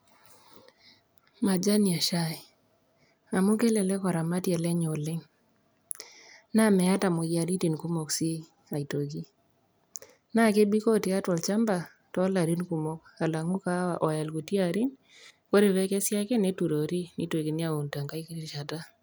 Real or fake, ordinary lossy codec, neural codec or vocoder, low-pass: real; none; none; none